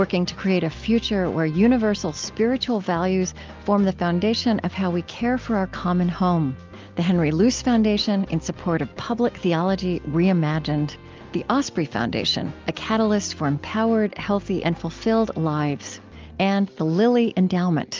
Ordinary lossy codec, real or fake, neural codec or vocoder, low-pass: Opus, 24 kbps; real; none; 7.2 kHz